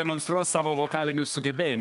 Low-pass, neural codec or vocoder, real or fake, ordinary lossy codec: 10.8 kHz; codec, 24 kHz, 1 kbps, SNAC; fake; MP3, 96 kbps